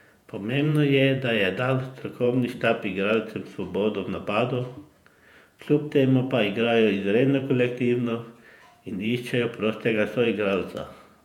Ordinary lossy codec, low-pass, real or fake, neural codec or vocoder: MP3, 96 kbps; 19.8 kHz; fake; vocoder, 48 kHz, 128 mel bands, Vocos